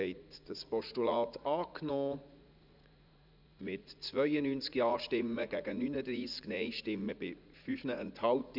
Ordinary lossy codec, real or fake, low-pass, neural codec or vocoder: none; fake; 5.4 kHz; vocoder, 44.1 kHz, 80 mel bands, Vocos